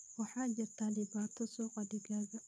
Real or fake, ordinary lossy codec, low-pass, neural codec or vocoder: fake; none; none; vocoder, 22.05 kHz, 80 mel bands, Vocos